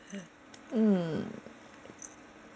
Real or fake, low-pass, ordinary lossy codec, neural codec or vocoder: real; none; none; none